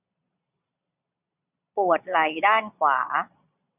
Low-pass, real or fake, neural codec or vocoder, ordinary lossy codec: 3.6 kHz; fake; vocoder, 22.05 kHz, 80 mel bands, Vocos; none